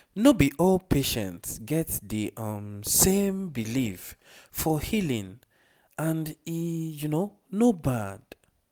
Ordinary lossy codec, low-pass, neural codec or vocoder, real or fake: none; none; none; real